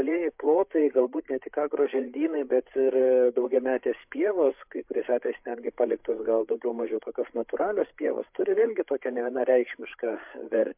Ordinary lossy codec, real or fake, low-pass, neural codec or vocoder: MP3, 32 kbps; fake; 3.6 kHz; codec, 16 kHz, 8 kbps, FreqCodec, larger model